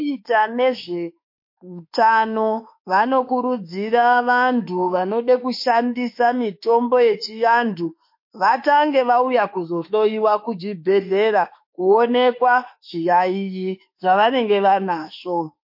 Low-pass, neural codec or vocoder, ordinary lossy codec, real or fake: 5.4 kHz; autoencoder, 48 kHz, 32 numbers a frame, DAC-VAE, trained on Japanese speech; MP3, 32 kbps; fake